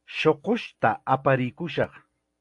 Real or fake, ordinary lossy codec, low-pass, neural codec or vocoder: real; AAC, 64 kbps; 10.8 kHz; none